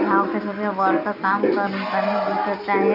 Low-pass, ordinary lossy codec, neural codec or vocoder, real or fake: 5.4 kHz; none; none; real